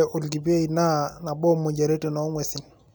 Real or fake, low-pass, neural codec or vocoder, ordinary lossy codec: real; none; none; none